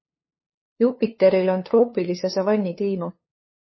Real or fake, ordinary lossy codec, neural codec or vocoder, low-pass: fake; MP3, 24 kbps; codec, 16 kHz, 2 kbps, FunCodec, trained on LibriTTS, 25 frames a second; 7.2 kHz